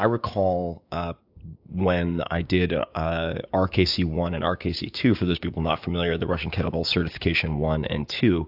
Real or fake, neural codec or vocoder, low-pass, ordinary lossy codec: fake; codec, 16 kHz, 6 kbps, DAC; 5.4 kHz; AAC, 48 kbps